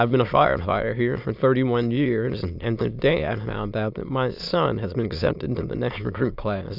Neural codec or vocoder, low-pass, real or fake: autoencoder, 22.05 kHz, a latent of 192 numbers a frame, VITS, trained on many speakers; 5.4 kHz; fake